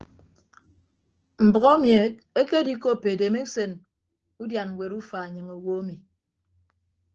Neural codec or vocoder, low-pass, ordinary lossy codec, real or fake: codec, 16 kHz, 6 kbps, DAC; 7.2 kHz; Opus, 16 kbps; fake